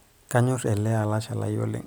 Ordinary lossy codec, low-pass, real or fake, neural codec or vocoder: none; none; real; none